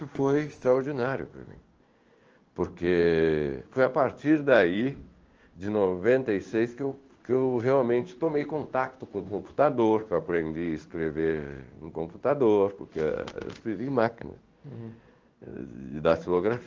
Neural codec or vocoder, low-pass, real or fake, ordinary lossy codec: codec, 16 kHz in and 24 kHz out, 1 kbps, XY-Tokenizer; 7.2 kHz; fake; Opus, 24 kbps